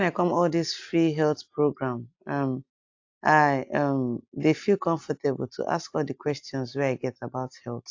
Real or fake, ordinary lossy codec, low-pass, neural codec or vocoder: real; AAC, 48 kbps; 7.2 kHz; none